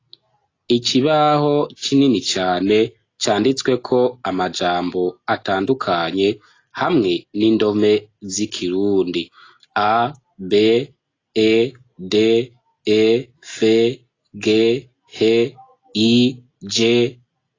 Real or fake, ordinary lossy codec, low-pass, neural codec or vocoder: real; AAC, 32 kbps; 7.2 kHz; none